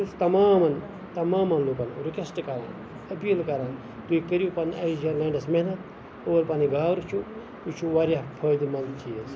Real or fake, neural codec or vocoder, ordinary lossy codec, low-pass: real; none; none; none